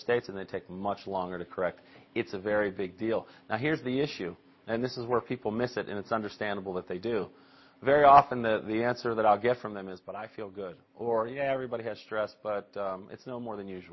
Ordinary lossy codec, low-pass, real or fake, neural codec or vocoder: MP3, 24 kbps; 7.2 kHz; real; none